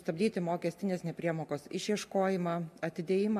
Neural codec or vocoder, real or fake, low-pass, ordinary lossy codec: none; real; 14.4 kHz; MP3, 64 kbps